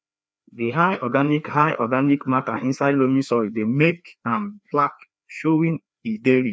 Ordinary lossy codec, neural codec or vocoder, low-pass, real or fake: none; codec, 16 kHz, 2 kbps, FreqCodec, larger model; none; fake